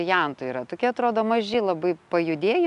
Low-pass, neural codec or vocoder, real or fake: 10.8 kHz; none; real